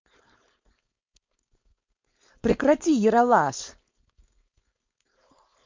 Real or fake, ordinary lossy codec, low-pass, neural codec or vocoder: fake; MP3, 48 kbps; 7.2 kHz; codec, 16 kHz, 4.8 kbps, FACodec